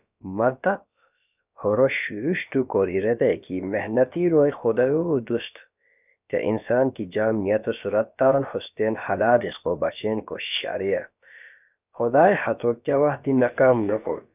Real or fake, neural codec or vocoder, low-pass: fake; codec, 16 kHz, about 1 kbps, DyCAST, with the encoder's durations; 3.6 kHz